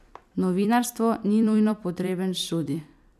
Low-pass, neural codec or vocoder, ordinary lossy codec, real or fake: 14.4 kHz; vocoder, 44.1 kHz, 128 mel bands every 256 samples, BigVGAN v2; none; fake